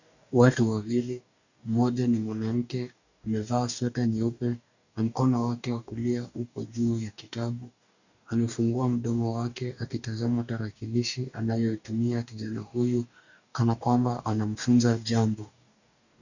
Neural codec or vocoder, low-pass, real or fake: codec, 44.1 kHz, 2.6 kbps, DAC; 7.2 kHz; fake